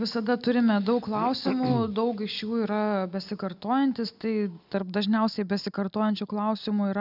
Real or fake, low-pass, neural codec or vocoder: real; 5.4 kHz; none